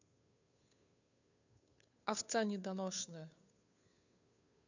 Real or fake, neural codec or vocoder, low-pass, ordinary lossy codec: fake; codec, 16 kHz, 2 kbps, FunCodec, trained on Chinese and English, 25 frames a second; 7.2 kHz; none